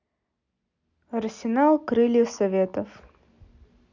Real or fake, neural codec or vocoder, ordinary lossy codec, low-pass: real; none; none; 7.2 kHz